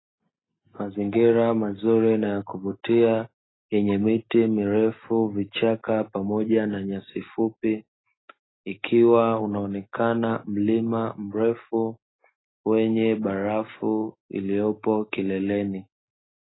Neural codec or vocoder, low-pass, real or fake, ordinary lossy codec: none; 7.2 kHz; real; AAC, 16 kbps